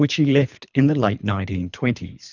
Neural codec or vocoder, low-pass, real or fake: codec, 24 kHz, 3 kbps, HILCodec; 7.2 kHz; fake